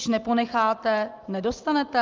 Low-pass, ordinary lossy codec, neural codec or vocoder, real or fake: 7.2 kHz; Opus, 24 kbps; none; real